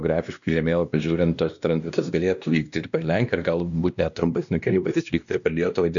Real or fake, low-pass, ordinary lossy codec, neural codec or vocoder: fake; 7.2 kHz; MP3, 96 kbps; codec, 16 kHz, 1 kbps, X-Codec, WavLM features, trained on Multilingual LibriSpeech